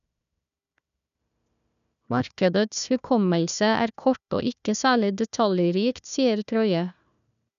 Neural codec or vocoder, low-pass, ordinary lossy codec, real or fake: codec, 16 kHz, 1 kbps, FunCodec, trained on Chinese and English, 50 frames a second; 7.2 kHz; none; fake